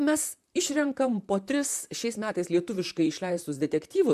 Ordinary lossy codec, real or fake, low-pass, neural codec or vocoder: MP3, 96 kbps; fake; 14.4 kHz; vocoder, 44.1 kHz, 128 mel bands, Pupu-Vocoder